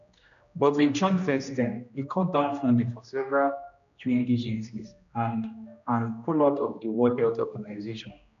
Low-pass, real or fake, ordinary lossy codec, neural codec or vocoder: 7.2 kHz; fake; none; codec, 16 kHz, 1 kbps, X-Codec, HuBERT features, trained on general audio